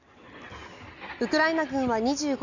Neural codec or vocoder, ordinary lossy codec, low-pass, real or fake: none; none; 7.2 kHz; real